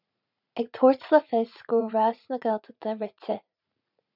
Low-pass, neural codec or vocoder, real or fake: 5.4 kHz; vocoder, 44.1 kHz, 80 mel bands, Vocos; fake